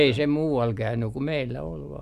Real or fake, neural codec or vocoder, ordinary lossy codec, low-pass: real; none; none; 14.4 kHz